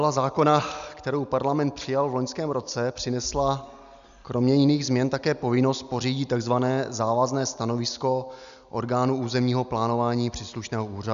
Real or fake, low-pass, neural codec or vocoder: real; 7.2 kHz; none